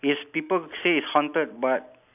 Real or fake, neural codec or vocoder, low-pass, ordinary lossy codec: real; none; 3.6 kHz; none